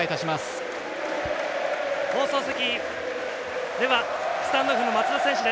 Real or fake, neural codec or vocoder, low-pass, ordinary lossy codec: real; none; none; none